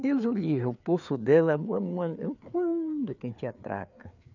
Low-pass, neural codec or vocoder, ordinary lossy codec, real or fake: 7.2 kHz; codec, 16 kHz, 4 kbps, FreqCodec, larger model; none; fake